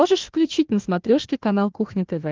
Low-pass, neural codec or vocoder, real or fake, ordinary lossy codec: 7.2 kHz; codec, 16 kHz, 1 kbps, FunCodec, trained on Chinese and English, 50 frames a second; fake; Opus, 16 kbps